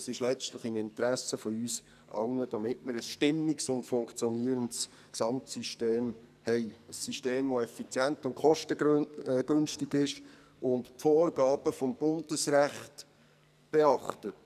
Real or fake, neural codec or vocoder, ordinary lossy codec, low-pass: fake; codec, 44.1 kHz, 2.6 kbps, SNAC; none; 14.4 kHz